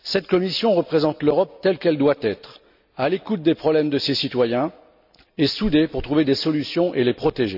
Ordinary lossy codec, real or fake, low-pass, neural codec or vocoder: none; real; 5.4 kHz; none